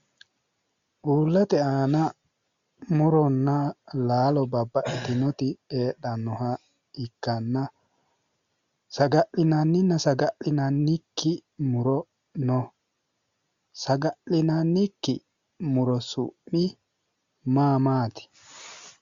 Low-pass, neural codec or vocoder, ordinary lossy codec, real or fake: 7.2 kHz; none; Opus, 64 kbps; real